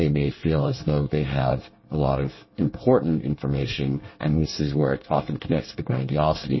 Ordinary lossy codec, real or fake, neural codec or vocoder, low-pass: MP3, 24 kbps; fake; codec, 24 kHz, 1 kbps, SNAC; 7.2 kHz